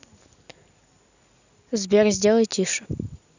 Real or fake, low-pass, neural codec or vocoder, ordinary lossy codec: real; 7.2 kHz; none; none